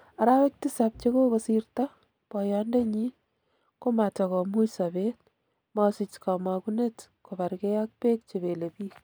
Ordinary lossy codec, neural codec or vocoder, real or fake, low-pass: none; none; real; none